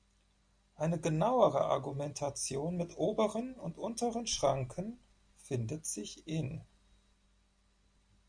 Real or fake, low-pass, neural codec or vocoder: real; 9.9 kHz; none